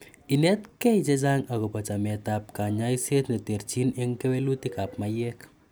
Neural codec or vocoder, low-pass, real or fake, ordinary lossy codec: none; none; real; none